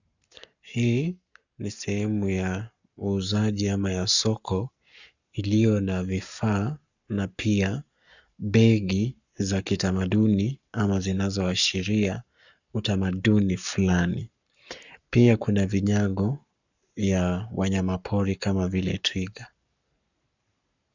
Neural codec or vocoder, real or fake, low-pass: codec, 44.1 kHz, 7.8 kbps, Pupu-Codec; fake; 7.2 kHz